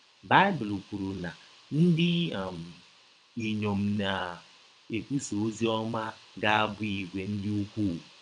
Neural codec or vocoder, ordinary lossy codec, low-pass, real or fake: vocoder, 22.05 kHz, 80 mel bands, WaveNeXt; none; 9.9 kHz; fake